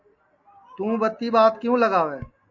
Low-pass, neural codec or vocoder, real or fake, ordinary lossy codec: 7.2 kHz; none; real; MP3, 48 kbps